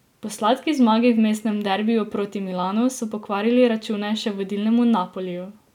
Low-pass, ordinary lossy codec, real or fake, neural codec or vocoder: 19.8 kHz; none; real; none